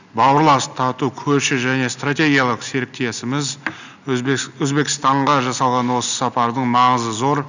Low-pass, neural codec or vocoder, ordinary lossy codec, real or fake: 7.2 kHz; none; none; real